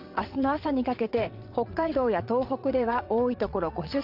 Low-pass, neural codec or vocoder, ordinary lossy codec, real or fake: 5.4 kHz; vocoder, 22.05 kHz, 80 mel bands, WaveNeXt; AAC, 48 kbps; fake